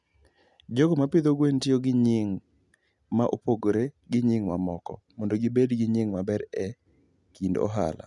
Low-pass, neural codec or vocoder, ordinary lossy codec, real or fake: 10.8 kHz; none; none; real